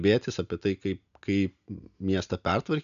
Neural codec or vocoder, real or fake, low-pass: none; real; 7.2 kHz